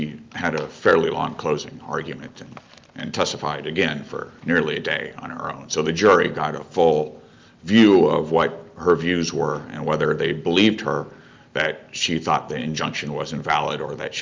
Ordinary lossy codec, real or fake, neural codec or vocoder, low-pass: Opus, 24 kbps; real; none; 7.2 kHz